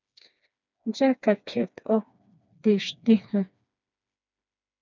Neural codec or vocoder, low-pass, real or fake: codec, 16 kHz, 2 kbps, FreqCodec, smaller model; 7.2 kHz; fake